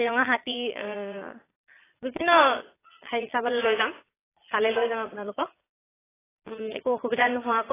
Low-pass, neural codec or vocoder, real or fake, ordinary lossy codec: 3.6 kHz; vocoder, 22.05 kHz, 80 mel bands, Vocos; fake; AAC, 16 kbps